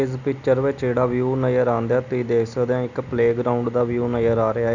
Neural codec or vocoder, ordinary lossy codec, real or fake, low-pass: none; none; real; 7.2 kHz